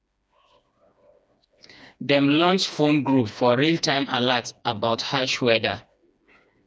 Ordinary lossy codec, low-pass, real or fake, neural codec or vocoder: none; none; fake; codec, 16 kHz, 2 kbps, FreqCodec, smaller model